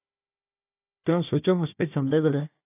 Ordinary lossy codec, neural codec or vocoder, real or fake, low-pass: AAC, 24 kbps; codec, 16 kHz, 1 kbps, FunCodec, trained on Chinese and English, 50 frames a second; fake; 3.6 kHz